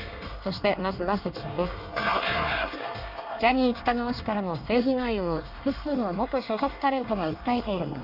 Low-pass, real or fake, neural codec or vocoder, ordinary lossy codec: 5.4 kHz; fake; codec, 24 kHz, 1 kbps, SNAC; none